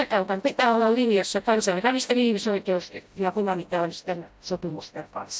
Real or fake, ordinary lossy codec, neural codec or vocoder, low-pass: fake; none; codec, 16 kHz, 0.5 kbps, FreqCodec, smaller model; none